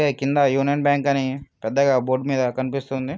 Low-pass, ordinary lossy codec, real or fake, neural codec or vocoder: none; none; real; none